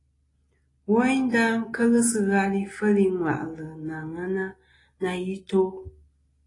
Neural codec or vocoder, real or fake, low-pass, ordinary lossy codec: none; real; 10.8 kHz; AAC, 32 kbps